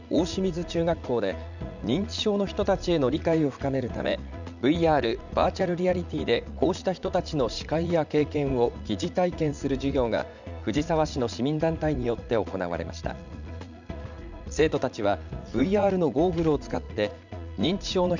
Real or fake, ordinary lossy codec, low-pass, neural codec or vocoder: fake; none; 7.2 kHz; vocoder, 44.1 kHz, 80 mel bands, Vocos